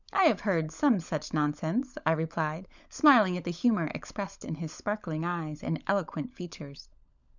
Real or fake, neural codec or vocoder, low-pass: fake; codec, 16 kHz, 16 kbps, FunCodec, trained on LibriTTS, 50 frames a second; 7.2 kHz